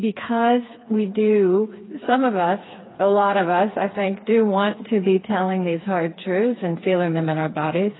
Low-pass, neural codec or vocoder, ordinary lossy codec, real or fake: 7.2 kHz; codec, 16 kHz, 4 kbps, FreqCodec, smaller model; AAC, 16 kbps; fake